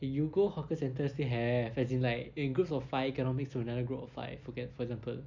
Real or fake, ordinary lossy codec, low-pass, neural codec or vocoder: real; none; 7.2 kHz; none